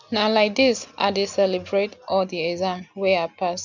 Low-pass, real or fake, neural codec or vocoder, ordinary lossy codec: 7.2 kHz; real; none; none